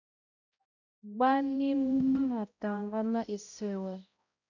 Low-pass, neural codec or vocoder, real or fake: 7.2 kHz; codec, 16 kHz, 0.5 kbps, X-Codec, HuBERT features, trained on balanced general audio; fake